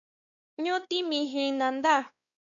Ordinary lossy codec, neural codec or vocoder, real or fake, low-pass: MP3, 96 kbps; codec, 16 kHz, 2 kbps, X-Codec, WavLM features, trained on Multilingual LibriSpeech; fake; 7.2 kHz